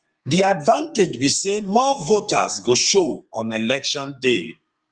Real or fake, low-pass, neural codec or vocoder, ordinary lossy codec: fake; 9.9 kHz; codec, 32 kHz, 1.9 kbps, SNAC; Opus, 32 kbps